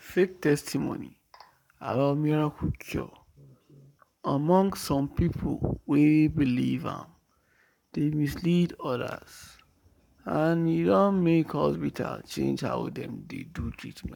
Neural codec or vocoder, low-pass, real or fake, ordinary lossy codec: codec, 44.1 kHz, 7.8 kbps, Pupu-Codec; 19.8 kHz; fake; none